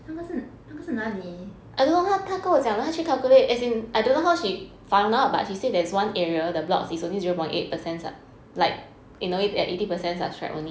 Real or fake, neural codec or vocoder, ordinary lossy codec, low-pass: real; none; none; none